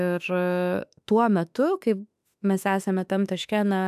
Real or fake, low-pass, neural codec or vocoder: fake; 14.4 kHz; autoencoder, 48 kHz, 32 numbers a frame, DAC-VAE, trained on Japanese speech